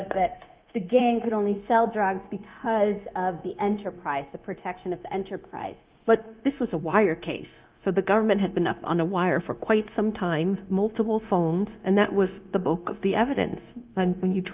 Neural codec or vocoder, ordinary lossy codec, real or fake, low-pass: codec, 16 kHz, 0.9 kbps, LongCat-Audio-Codec; Opus, 24 kbps; fake; 3.6 kHz